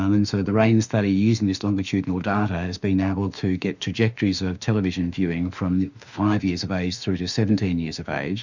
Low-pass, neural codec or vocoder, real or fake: 7.2 kHz; autoencoder, 48 kHz, 32 numbers a frame, DAC-VAE, trained on Japanese speech; fake